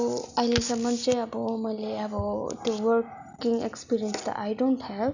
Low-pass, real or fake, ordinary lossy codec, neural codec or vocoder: 7.2 kHz; real; none; none